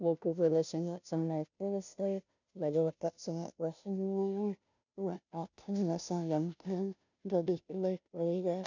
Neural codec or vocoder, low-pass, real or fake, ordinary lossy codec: codec, 16 kHz, 0.5 kbps, FunCodec, trained on Chinese and English, 25 frames a second; 7.2 kHz; fake; none